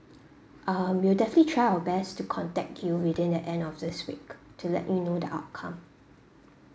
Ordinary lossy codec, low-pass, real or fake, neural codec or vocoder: none; none; real; none